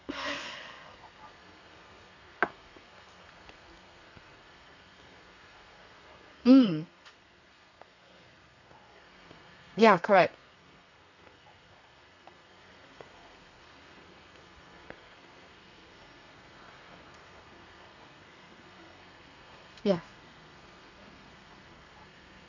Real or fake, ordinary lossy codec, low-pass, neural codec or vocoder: fake; none; 7.2 kHz; codec, 32 kHz, 1.9 kbps, SNAC